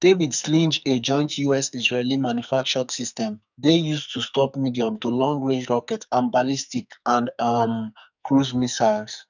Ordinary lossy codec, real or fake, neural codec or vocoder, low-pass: none; fake; codec, 44.1 kHz, 2.6 kbps, SNAC; 7.2 kHz